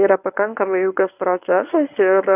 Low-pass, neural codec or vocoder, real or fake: 3.6 kHz; codec, 24 kHz, 0.9 kbps, WavTokenizer, medium speech release version 1; fake